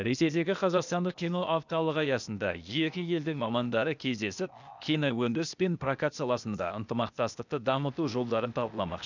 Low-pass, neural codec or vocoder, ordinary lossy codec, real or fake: 7.2 kHz; codec, 16 kHz, 0.8 kbps, ZipCodec; none; fake